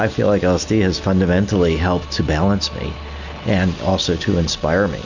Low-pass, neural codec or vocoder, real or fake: 7.2 kHz; none; real